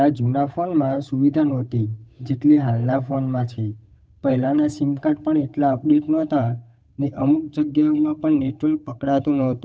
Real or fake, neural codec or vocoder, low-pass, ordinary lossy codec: fake; codec, 16 kHz, 8 kbps, FunCodec, trained on Chinese and English, 25 frames a second; none; none